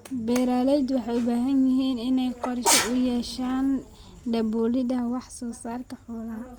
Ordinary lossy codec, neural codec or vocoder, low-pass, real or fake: Opus, 32 kbps; none; 19.8 kHz; real